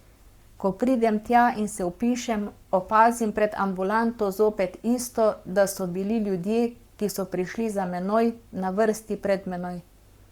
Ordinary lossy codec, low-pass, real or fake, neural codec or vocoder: Opus, 64 kbps; 19.8 kHz; fake; codec, 44.1 kHz, 7.8 kbps, Pupu-Codec